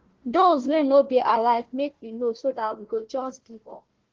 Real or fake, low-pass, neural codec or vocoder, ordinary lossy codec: fake; 7.2 kHz; codec, 16 kHz, 1 kbps, FunCodec, trained on Chinese and English, 50 frames a second; Opus, 16 kbps